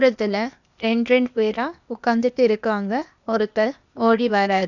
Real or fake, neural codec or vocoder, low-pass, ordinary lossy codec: fake; codec, 16 kHz, 0.8 kbps, ZipCodec; 7.2 kHz; none